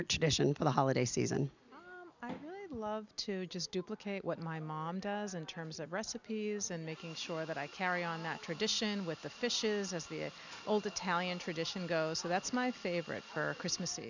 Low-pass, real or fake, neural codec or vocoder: 7.2 kHz; real; none